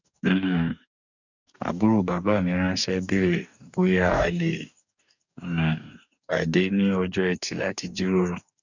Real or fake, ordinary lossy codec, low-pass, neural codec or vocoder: fake; none; 7.2 kHz; codec, 44.1 kHz, 2.6 kbps, DAC